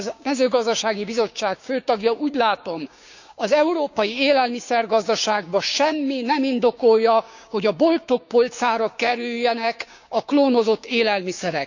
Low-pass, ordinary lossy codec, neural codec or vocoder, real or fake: 7.2 kHz; none; codec, 16 kHz, 6 kbps, DAC; fake